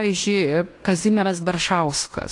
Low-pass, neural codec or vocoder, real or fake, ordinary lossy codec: 10.8 kHz; codec, 16 kHz in and 24 kHz out, 0.9 kbps, LongCat-Audio-Codec, fine tuned four codebook decoder; fake; AAC, 48 kbps